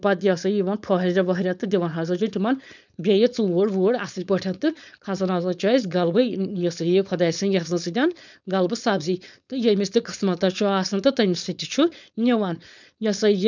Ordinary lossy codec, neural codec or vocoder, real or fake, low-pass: none; codec, 16 kHz, 4.8 kbps, FACodec; fake; 7.2 kHz